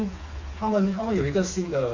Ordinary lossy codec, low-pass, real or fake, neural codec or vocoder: Opus, 64 kbps; 7.2 kHz; fake; codec, 16 kHz, 4 kbps, FreqCodec, smaller model